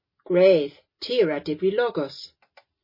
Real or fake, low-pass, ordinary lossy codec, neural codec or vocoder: real; 5.4 kHz; MP3, 24 kbps; none